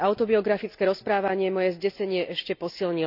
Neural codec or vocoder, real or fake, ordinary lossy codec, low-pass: none; real; none; 5.4 kHz